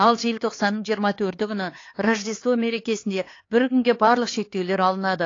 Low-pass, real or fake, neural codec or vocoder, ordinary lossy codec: 7.2 kHz; fake; codec, 16 kHz, 4 kbps, X-Codec, HuBERT features, trained on LibriSpeech; AAC, 32 kbps